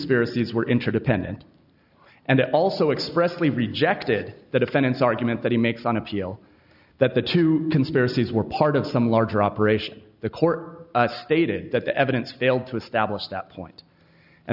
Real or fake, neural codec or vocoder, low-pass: real; none; 5.4 kHz